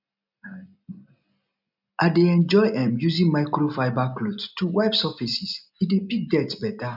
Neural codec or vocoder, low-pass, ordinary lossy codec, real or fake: none; 5.4 kHz; none; real